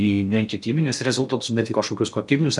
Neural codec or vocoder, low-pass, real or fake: codec, 16 kHz in and 24 kHz out, 0.6 kbps, FocalCodec, streaming, 4096 codes; 10.8 kHz; fake